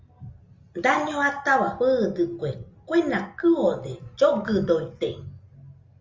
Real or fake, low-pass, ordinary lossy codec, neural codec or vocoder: real; 7.2 kHz; Opus, 32 kbps; none